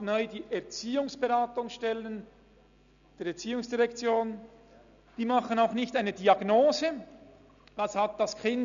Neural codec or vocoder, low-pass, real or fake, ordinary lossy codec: none; 7.2 kHz; real; none